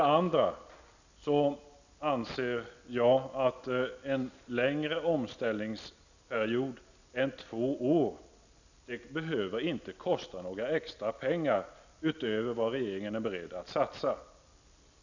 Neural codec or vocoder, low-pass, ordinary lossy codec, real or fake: none; 7.2 kHz; none; real